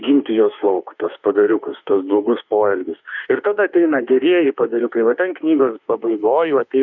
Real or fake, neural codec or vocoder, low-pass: fake; autoencoder, 48 kHz, 32 numbers a frame, DAC-VAE, trained on Japanese speech; 7.2 kHz